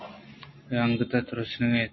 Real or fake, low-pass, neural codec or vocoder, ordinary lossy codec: real; 7.2 kHz; none; MP3, 24 kbps